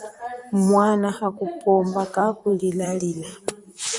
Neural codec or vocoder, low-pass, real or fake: vocoder, 44.1 kHz, 128 mel bands, Pupu-Vocoder; 10.8 kHz; fake